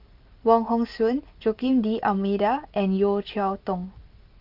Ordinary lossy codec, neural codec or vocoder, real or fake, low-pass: Opus, 32 kbps; none; real; 5.4 kHz